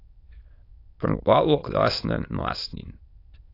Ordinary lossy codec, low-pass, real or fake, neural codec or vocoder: AAC, 32 kbps; 5.4 kHz; fake; autoencoder, 22.05 kHz, a latent of 192 numbers a frame, VITS, trained on many speakers